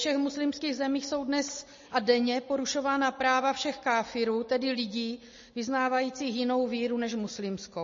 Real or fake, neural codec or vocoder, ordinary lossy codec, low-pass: real; none; MP3, 32 kbps; 7.2 kHz